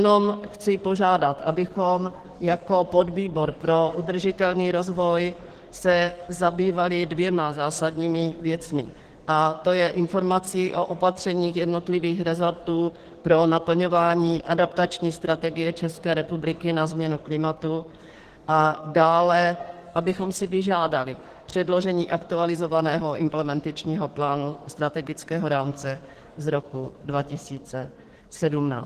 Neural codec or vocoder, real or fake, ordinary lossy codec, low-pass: codec, 44.1 kHz, 2.6 kbps, SNAC; fake; Opus, 16 kbps; 14.4 kHz